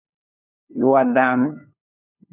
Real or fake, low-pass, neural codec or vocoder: fake; 3.6 kHz; codec, 16 kHz, 2 kbps, FunCodec, trained on LibriTTS, 25 frames a second